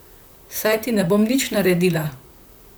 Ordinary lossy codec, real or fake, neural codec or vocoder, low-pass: none; fake; vocoder, 44.1 kHz, 128 mel bands, Pupu-Vocoder; none